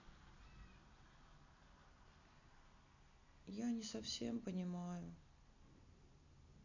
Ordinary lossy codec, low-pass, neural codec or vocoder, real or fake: none; 7.2 kHz; none; real